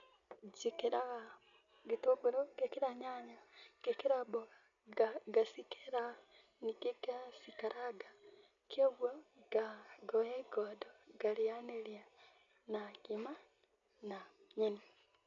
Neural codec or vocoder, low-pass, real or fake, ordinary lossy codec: none; 7.2 kHz; real; none